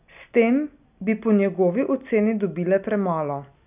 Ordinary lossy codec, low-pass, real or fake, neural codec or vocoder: none; 3.6 kHz; real; none